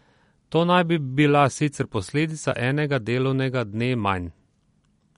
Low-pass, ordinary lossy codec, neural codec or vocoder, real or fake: 10.8 kHz; MP3, 48 kbps; none; real